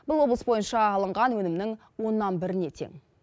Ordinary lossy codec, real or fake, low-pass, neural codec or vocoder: none; real; none; none